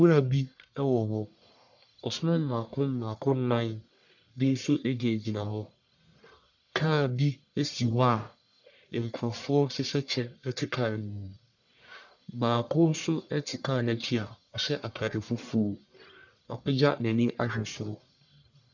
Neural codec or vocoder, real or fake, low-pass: codec, 44.1 kHz, 1.7 kbps, Pupu-Codec; fake; 7.2 kHz